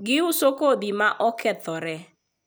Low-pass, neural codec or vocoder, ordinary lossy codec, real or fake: none; none; none; real